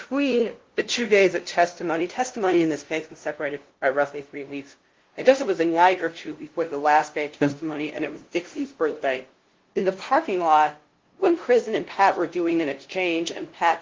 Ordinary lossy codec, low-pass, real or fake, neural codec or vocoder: Opus, 16 kbps; 7.2 kHz; fake; codec, 16 kHz, 0.5 kbps, FunCodec, trained on LibriTTS, 25 frames a second